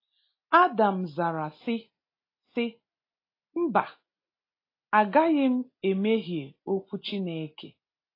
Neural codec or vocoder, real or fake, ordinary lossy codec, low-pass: none; real; AAC, 32 kbps; 5.4 kHz